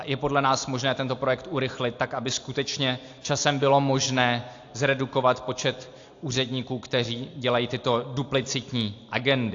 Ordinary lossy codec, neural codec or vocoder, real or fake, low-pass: AAC, 48 kbps; none; real; 7.2 kHz